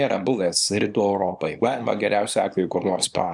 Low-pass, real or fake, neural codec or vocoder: 10.8 kHz; fake; codec, 24 kHz, 0.9 kbps, WavTokenizer, small release